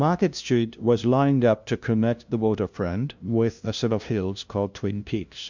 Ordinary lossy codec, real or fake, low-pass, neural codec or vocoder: MP3, 64 kbps; fake; 7.2 kHz; codec, 16 kHz, 0.5 kbps, FunCodec, trained on LibriTTS, 25 frames a second